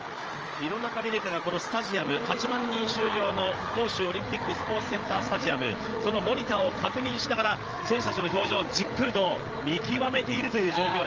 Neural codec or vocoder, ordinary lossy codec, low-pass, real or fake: codec, 16 kHz, 8 kbps, FreqCodec, larger model; Opus, 24 kbps; 7.2 kHz; fake